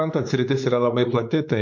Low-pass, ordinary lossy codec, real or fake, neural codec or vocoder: 7.2 kHz; MP3, 48 kbps; fake; codec, 16 kHz, 4 kbps, X-Codec, WavLM features, trained on Multilingual LibriSpeech